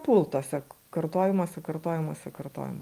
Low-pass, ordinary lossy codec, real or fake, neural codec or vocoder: 14.4 kHz; Opus, 32 kbps; real; none